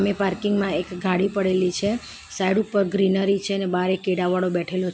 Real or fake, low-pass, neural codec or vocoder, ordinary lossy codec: real; none; none; none